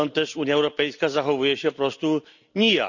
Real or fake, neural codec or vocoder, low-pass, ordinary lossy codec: real; none; 7.2 kHz; none